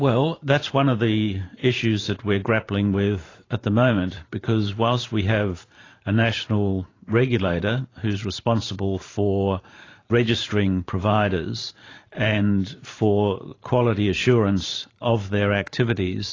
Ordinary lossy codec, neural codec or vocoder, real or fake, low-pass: AAC, 32 kbps; vocoder, 44.1 kHz, 128 mel bands every 512 samples, BigVGAN v2; fake; 7.2 kHz